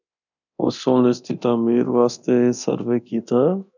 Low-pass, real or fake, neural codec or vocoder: 7.2 kHz; fake; codec, 24 kHz, 0.9 kbps, DualCodec